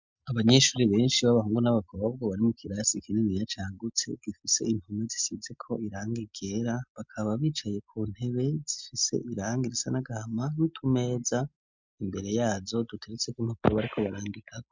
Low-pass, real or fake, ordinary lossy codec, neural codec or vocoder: 7.2 kHz; real; MP3, 64 kbps; none